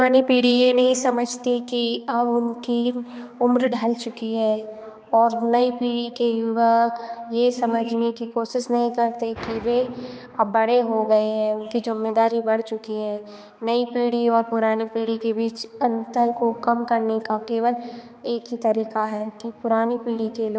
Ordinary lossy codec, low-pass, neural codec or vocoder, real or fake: none; none; codec, 16 kHz, 2 kbps, X-Codec, HuBERT features, trained on balanced general audio; fake